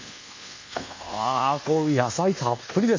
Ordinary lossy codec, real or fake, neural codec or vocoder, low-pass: MP3, 48 kbps; fake; codec, 24 kHz, 1.2 kbps, DualCodec; 7.2 kHz